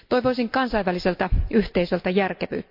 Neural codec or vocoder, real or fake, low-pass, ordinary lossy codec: none; real; 5.4 kHz; MP3, 48 kbps